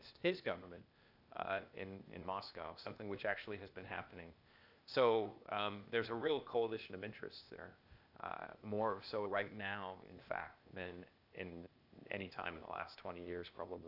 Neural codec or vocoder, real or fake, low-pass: codec, 16 kHz, 0.8 kbps, ZipCodec; fake; 5.4 kHz